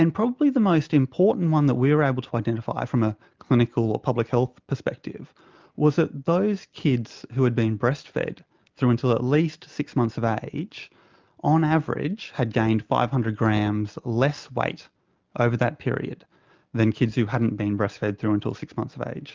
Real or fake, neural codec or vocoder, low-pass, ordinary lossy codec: real; none; 7.2 kHz; Opus, 24 kbps